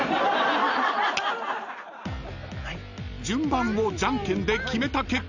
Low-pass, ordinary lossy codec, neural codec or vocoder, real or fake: 7.2 kHz; Opus, 64 kbps; none; real